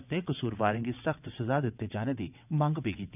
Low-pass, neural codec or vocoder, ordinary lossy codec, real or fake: 3.6 kHz; vocoder, 22.05 kHz, 80 mel bands, WaveNeXt; none; fake